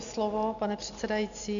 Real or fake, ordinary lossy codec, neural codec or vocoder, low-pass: real; MP3, 96 kbps; none; 7.2 kHz